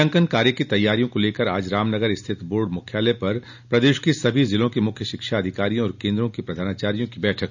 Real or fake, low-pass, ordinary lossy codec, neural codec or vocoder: real; 7.2 kHz; none; none